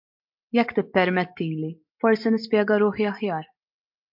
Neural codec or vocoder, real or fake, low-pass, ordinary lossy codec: none; real; 5.4 kHz; MP3, 48 kbps